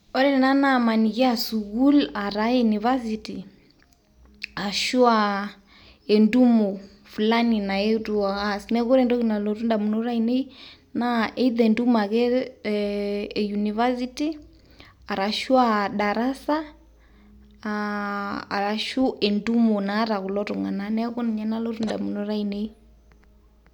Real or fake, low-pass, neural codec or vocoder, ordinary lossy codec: real; 19.8 kHz; none; none